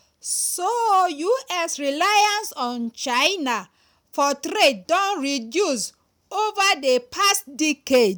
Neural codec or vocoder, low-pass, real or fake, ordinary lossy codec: none; none; real; none